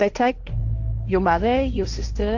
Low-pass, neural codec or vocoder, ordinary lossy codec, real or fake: 7.2 kHz; codec, 16 kHz, 1.1 kbps, Voila-Tokenizer; AAC, 48 kbps; fake